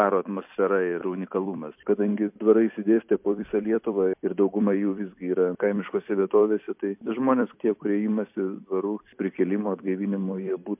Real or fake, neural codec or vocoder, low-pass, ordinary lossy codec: fake; vocoder, 44.1 kHz, 128 mel bands every 256 samples, BigVGAN v2; 3.6 kHz; MP3, 32 kbps